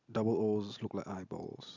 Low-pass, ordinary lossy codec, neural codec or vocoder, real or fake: 7.2 kHz; none; none; real